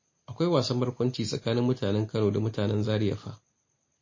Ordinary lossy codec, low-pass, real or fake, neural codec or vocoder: MP3, 32 kbps; 7.2 kHz; real; none